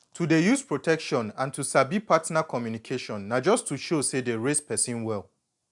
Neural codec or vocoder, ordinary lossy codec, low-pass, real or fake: none; none; 10.8 kHz; real